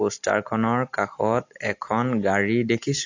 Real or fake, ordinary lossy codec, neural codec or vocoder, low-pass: real; AAC, 48 kbps; none; 7.2 kHz